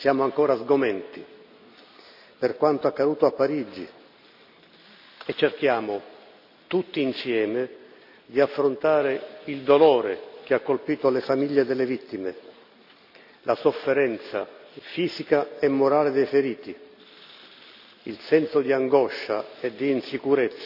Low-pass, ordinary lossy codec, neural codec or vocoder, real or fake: 5.4 kHz; none; none; real